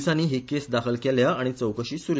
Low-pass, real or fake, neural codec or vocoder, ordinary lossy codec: none; real; none; none